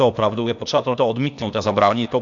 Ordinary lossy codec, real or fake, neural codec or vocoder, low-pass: MP3, 64 kbps; fake; codec, 16 kHz, 0.8 kbps, ZipCodec; 7.2 kHz